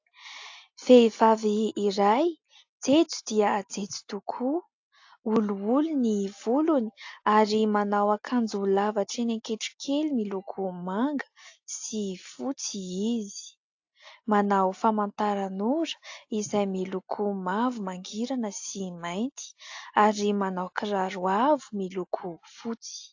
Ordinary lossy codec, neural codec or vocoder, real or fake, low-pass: AAC, 48 kbps; none; real; 7.2 kHz